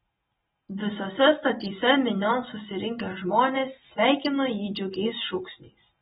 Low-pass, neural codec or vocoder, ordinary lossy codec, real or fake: 7.2 kHz; none; AAC, 16 kbps; real